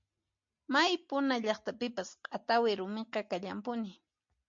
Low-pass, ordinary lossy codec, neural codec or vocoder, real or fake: 7.2 kHz; MP3, 48 kbps; none; real